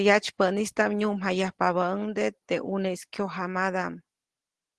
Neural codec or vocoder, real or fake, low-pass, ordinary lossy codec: none; real; 10.8 kHz; Opus, 16 kbps